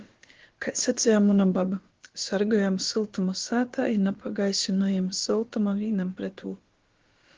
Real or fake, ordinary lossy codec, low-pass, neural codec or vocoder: fake; Opus, 16 kbps; 7.2 kHz; codec, 16 kHz, about 1 kbps, DyCAST, with the encoder's durations